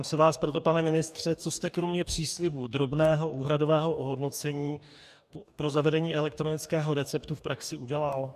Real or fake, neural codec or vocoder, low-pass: fake; codec, 44.1 kHz, 2.6 kbps, DAC; 14.4 kHz